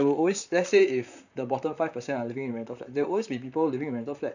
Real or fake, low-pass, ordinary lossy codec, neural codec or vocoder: fake; 7.2 kHz; none; vocoder, 44.1 kHz, 128 mel bands, Pupu-Vocoder